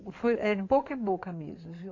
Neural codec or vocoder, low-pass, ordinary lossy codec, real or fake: codec, 16 kHz in and 24 kHz out, 2.2 kbps, FireRedTTS-2 codec; 7.2 kHz; AAC, 48 kbps; fake